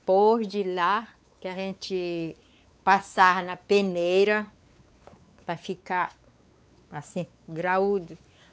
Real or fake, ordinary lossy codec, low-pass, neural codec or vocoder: fake; none; none; codec, 16 kHz, 4 kbps, X-Codec, WavLM features, trained on Multilingual LibriSpeech